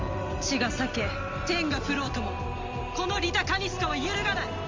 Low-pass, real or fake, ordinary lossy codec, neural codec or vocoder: 7.2 kHz; real; Opus, 32 kbps; none